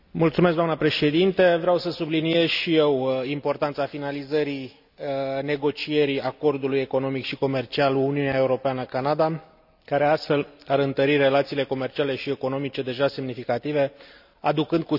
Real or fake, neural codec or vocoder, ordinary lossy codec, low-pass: real; none; none; 5.4 kHz